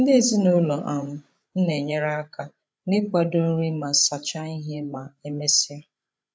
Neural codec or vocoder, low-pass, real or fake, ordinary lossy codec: codec, 16 kHz, 16 kbps, FreqCodec, larger model; none; fake; none